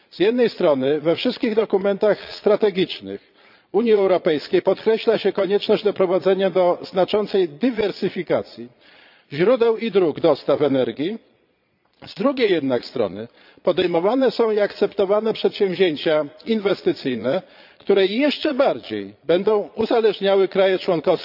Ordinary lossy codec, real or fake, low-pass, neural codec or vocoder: none; fake; 5.4 kHz; vocoder, 22.05 kHz, 80 mel bands, Vocos